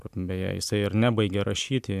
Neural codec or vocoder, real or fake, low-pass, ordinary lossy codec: none; real; 14.4 kHz; MP3, 96 kbps